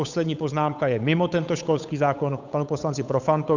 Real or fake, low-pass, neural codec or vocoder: fake; 7.2 kHz; codec, 16 kHz, 8 kbps, FunCodec, trained on Chinese and English, 25 frames a second